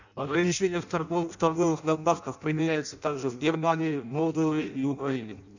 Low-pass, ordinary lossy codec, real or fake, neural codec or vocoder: 7.2 kHz; none; fake; codec, 16 kHz in and 24 kHz out, 0.6 kbps, FireRedTTS-2 codec